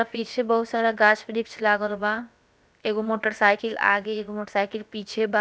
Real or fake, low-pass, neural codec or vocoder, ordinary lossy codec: fake; none; codec, 16 kHz, about 1 kbps, DyCAST, with the encoder's durations; none